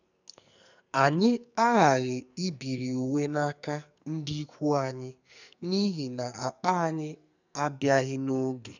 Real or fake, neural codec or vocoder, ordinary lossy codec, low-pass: fake; codec, 44.1 kHz, 2.6 kbps, SNAC; none; 7.2 kHz